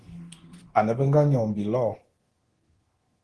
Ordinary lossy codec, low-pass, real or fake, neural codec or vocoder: Opus, 16 kbps; 10.8 kHz; fake; codec, 24 kHz, 0.9 kbps, DualCodec